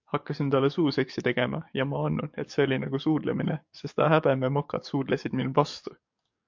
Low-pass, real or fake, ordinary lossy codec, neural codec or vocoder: 7.2 kHz; fake; MP3, 48 kbps; codec, 44.1 kHz, 7.8 kbps, DAC